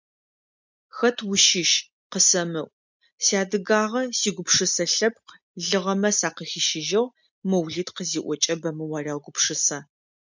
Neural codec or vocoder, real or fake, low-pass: none; real; 7.2 kHz